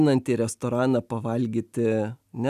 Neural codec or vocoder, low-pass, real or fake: none; 14.4 kHz; real